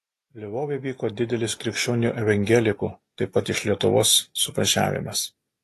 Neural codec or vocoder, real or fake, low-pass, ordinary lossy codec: none; real; 14.4 kHz; AAC, 48 kbps